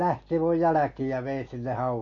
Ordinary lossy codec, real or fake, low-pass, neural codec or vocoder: AAC, 48 kbps; real; 7.2 kHz; none